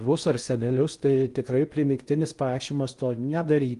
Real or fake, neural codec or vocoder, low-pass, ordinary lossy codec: fake; codec, 16 kHz in and 24 kHz out, 0.6 kbps, FocalCodec, streaming, 2048 codes; 10.8 kHz; Opus, 32 kbps